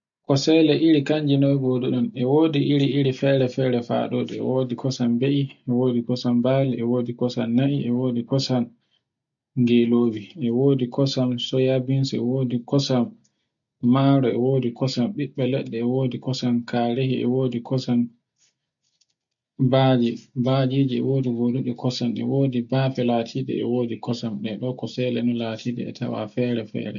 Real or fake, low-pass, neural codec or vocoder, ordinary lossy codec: real; 7.2 kHz; none; none